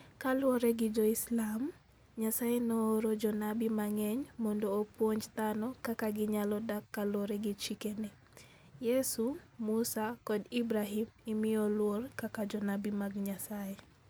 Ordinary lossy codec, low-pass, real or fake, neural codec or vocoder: none; none; real; none